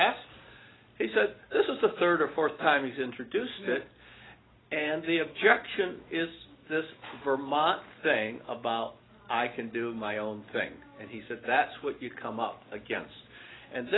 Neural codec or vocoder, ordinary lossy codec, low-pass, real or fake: none; AAC, 16 kbps; 7.2 kHz; real